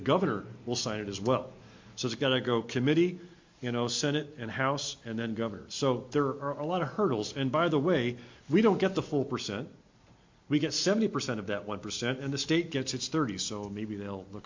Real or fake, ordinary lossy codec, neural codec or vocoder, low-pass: real; MP3, 48 kbps; none; 7.2 kHz